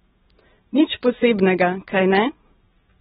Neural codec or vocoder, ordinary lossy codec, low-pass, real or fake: none; AAC, 16 kbps; 10.8 kHz; real